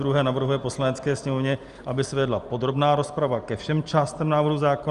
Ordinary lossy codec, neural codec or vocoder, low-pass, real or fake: Opus, 32 kbps; none; 10.8 kHz; real